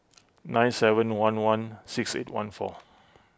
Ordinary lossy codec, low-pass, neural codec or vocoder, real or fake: none; none; none; real